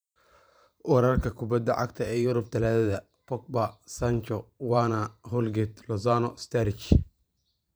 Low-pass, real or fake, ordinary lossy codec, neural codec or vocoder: none; fake; none; vocoder, 44.1 kHz, 128 mel bands every 512 samples, BigVGAN v2